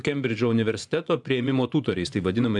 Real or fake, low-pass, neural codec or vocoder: fake; 10.8 kHz; vocoder, 24 kHz, 100 mel bands, Vocos